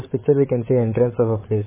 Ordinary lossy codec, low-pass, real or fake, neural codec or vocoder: MP3, 16 kbps; 3.6 kHz; fake; codec, 16 kHz, 16 kbps, FreqCodec, larger model